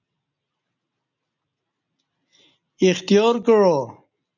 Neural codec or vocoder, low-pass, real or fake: none; 7.2 kHz; real